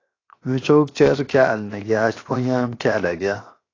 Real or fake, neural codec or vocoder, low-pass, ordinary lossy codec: fake; codec, 16 kHz, 0.7 kbps, FocalCodec; 7.2 kHz; AAC, 32 kbps